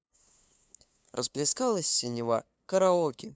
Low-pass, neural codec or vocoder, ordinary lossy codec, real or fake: none; codec, 16 kHz, 2 kbps, FunCodec, trained on LibriTTS, 25 frames a second; none; fake